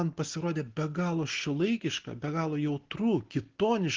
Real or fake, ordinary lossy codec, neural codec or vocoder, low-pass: real; Opus, 16 kbps; none; 7.2 kHz